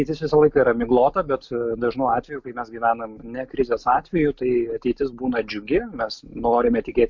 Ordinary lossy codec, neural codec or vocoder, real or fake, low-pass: MP3, 64 kbps; none; real; 7.2 kHz